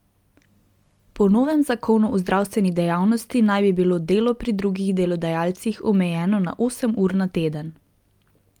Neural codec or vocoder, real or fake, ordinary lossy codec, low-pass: none; real; Opus, 32 kbps; 19.8 kHz